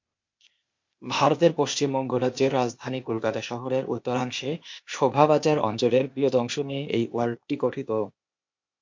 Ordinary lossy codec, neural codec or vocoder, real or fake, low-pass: MP3, 48 kbps; codec, 16 kHz, 0.8 kbps, ZipCodec; fake; 7.2 kHz